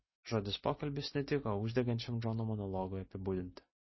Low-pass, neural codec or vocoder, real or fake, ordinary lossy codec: 7.2 kHz; none; real; MP3, 24 kbps